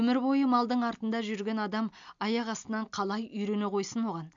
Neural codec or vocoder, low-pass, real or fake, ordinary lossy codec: none; 7.2 kHz; real; AAC, 64 kbps